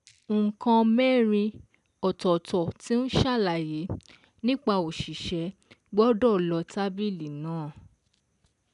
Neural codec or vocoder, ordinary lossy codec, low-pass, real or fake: none; none; 10.8 kHz; real